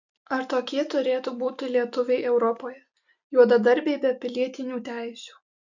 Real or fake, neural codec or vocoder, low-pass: real; none; 7.2 kHz